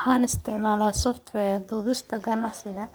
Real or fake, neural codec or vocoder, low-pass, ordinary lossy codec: fake; codec, 44.1 kHz, 3.4 kbps, Pupu-Codec; none; none